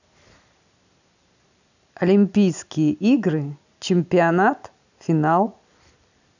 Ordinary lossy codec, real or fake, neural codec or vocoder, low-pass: none; real; none; 7.2 kHz